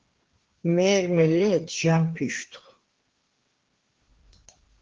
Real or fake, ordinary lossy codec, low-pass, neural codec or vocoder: fake; Opus, 16 kbps; 7.2 kHz; codec, 16 kHz, 2 kbps, FreqCodec, larger model